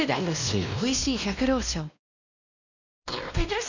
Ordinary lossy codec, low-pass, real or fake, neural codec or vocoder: none; 7.2 kHz; fake; codec, 16 kHz, 1 kbps, X-Codec, WavLM features, trained on Multilingual LibriSpeech